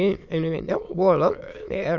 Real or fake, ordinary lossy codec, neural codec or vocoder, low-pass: fake; none; autoencoder, 22.05 kHz, a latent of 192 numbers a frame, VITS, trained on many speakers; 7.2 kHz